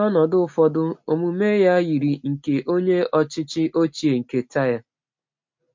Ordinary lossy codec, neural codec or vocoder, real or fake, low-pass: MP3, 48 kbps; none; real; 7.2 kHz